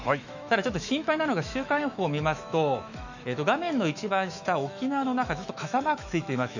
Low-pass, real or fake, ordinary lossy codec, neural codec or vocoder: 7.2 kHz; fake; none; autoencoder, 48 kHz, 128 numbers a frame, DAC-VAE, trained on Japanese speech